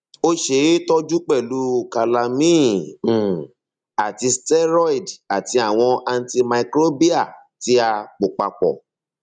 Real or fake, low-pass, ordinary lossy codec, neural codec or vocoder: real; 9.9 kHz; none; none